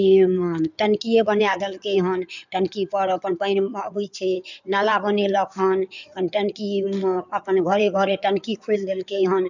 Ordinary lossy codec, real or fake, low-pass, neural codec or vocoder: none; fake; 7.2 kHz; codec, 16 kHz in and 24 kHz out, 2.2 kbps, FireRedTTS-2 codec